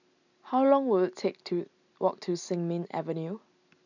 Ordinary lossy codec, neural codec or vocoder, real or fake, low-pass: none; none; real; 7.2 kHz